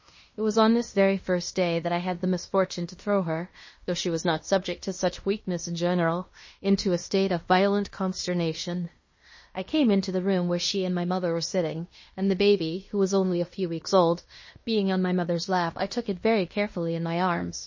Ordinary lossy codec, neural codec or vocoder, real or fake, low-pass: MP3, 32 kbps; codec, 16 kHz in and 24 kHz out, 0.9 kbps, LongCat-Audio-Codec, fine tuned four codebook decoder; fake; 7.2 kHz